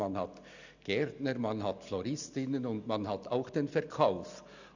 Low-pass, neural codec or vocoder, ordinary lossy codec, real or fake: 7.2 kHz; none; none; real